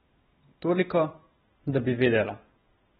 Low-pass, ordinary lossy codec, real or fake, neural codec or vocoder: 19.8 kHz; AAC, 16 kbps; real; none